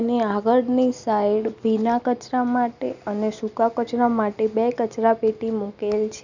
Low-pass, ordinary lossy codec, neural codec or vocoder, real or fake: 7.2 kHz; none; none; real